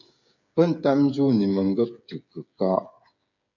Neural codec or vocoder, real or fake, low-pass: codec, 16 kHz, 8 kbps, FreqCodec, smaller model; fake; 7.2 kHz